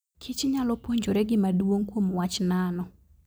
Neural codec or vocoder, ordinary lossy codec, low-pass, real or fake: none; none; none; real